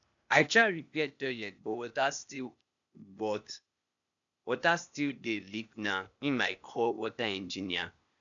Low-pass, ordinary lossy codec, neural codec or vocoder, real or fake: 7.2 kHz; none; codec, 16 kHz, 0.8 kbps, ZipCodec; fake